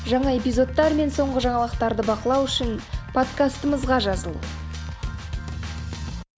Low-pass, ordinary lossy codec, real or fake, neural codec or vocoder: none; none; real; none